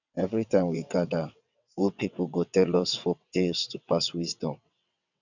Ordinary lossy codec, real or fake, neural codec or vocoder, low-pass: none; fake; vocoder, 22.05 kHz, 80 mel bands, WaveNeXt; 7.2 kHz